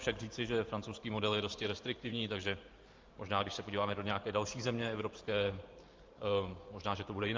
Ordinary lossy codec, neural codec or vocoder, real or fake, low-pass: Opus, 24 kbps; vocoder, 44.1 kHz, 128 mel bands every 512 samples, BigVGAN v2; fake; 7.2 kHz